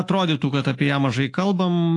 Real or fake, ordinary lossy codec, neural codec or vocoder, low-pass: fake; AAC, 48 kbps; autoencoder, 48 kHz, 128 numbers a frame, DAC-VAE, trained on Japanese speech; 10.8 kHz